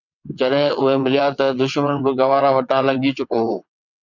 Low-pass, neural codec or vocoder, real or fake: 7.2 kHz; vocoder, 22.05 kHz, 80 mel bands, WaveNeXt; fake